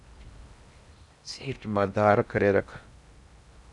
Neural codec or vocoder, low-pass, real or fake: codec, 16 kHz in and 24 kHz out, 0.6 kbps, FocalCodec, streaming, 4096 codes; 10.8 kHz; fake